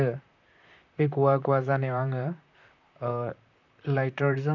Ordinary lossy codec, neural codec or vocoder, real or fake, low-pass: none; vocoder, 44.1 kHz, 128 mel bands, Pupu-Vocoder; fake; 7.2 kHz